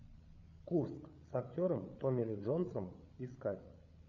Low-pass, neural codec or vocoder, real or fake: 7.2 kHz; codec, 16 kHz, 8 kbps, FreqCodec, larger model; fake